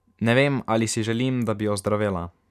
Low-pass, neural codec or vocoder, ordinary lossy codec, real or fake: 14.4 kHz; none; none; real